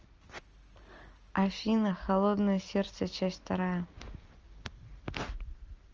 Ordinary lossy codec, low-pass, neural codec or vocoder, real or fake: Opus, 24 kbps; 7.2 kHz; none; real